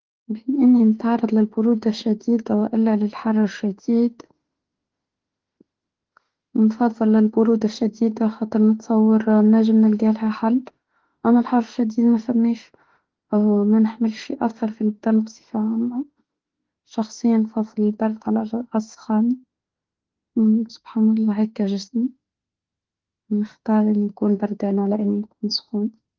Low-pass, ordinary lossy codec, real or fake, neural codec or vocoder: 7.2 kHz; Opus, 16 kbps; fake; autoencoder, 48 kHz, 32 numbers a frame, DAC-VAE, trained on Japanese speech